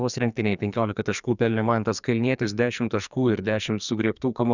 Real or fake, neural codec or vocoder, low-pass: fake; codec, 44.1 kHz, 2.6 kbps, SNAC; 7.2 kHz